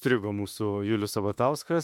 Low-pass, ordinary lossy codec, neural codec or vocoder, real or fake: 19.8 kHz; MP3, 96 kbps; autoencoder, 48 kHz, 32 numbers a frame, DAC-VAE, trained on Japanese speech; fake